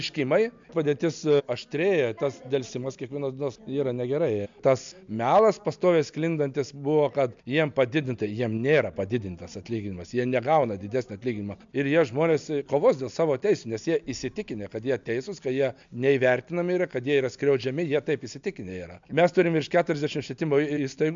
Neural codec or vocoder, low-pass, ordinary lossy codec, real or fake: none; 7.2 kHz; MP3, 64 kbps; real